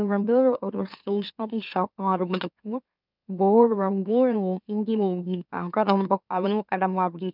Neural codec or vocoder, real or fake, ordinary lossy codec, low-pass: autoencoder, 44.1 kHz, a latent of 192 numbers a frame, MeloTTS; fake; none; 5.4 kHz